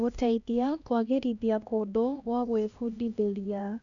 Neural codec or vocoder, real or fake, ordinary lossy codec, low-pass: codec, 16 kHz, 1 kbps, X-Codec, HuBERT features, trained on LibriSpeech; fake; none; 7.2 kHz